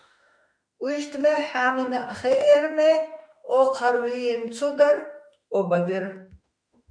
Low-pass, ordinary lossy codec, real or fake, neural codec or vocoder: 9.9 kHz; MP3, 96 kbps; fake; autoencoder, 48 kHz, 32 numbers a frame, DAC-VAE, trained on Japanese speech